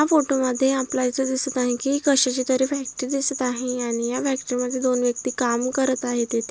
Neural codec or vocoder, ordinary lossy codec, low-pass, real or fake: none; none; none; real